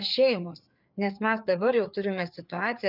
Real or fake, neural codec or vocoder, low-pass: fake; codec, 16 kHz, 4 kbps, FreqCodec, larger model; 5.4 kHz